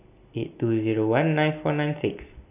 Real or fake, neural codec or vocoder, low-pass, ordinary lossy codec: real; none; 3.6 kHz; none